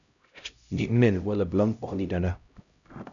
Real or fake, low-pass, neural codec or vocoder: fake; 7.2 kHz; codec, 16 kHz, 0.5 kbps, X-Codec, HuBERT features, trained on LibriSpeech